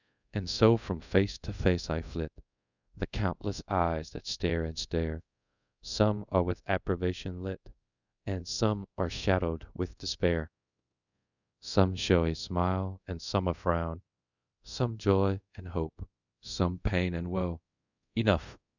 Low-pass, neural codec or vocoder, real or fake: 7.2 kHz; codec, 24 kHz, 0.5 kbps, DualCodec; fake